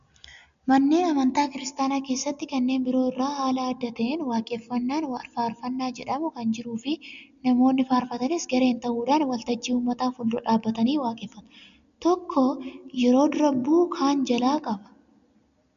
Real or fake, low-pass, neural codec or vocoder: real; 7.2 kHz; none